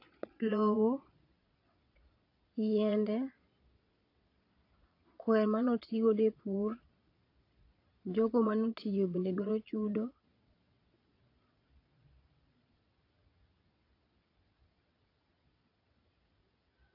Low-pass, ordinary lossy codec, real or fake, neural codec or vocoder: 5.4 kHz; AAC, 32 kbps; fake; vocoder, 22.05 kHz, 80 mel bands, Vocos